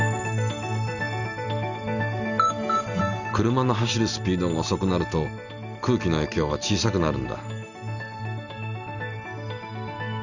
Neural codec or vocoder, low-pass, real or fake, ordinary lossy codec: none; 7.2 kHz; real; none